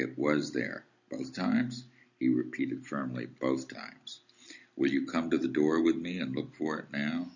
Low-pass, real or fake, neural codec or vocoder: 7.2 kHz; real; none